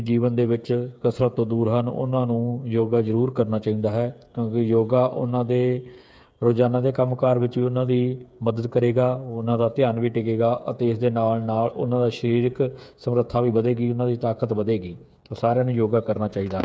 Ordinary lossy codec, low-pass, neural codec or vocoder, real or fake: none; none; codec, 16 kHz, 8 kbps, FreqCodec, smaller model; fake